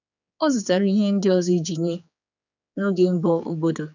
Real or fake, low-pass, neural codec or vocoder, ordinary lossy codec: fake; 7.2 kHz; codec, 16 kHz, 4 kbps, X-Codec, HuBERT features, trained on general audio; none